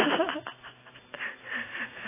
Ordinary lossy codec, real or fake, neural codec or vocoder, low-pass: AAC, 16 kbps; real; none; 3.6 kHz